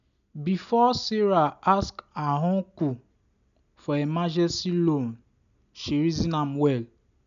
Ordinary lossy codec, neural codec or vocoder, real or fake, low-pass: none; none; real; 7.2 kHz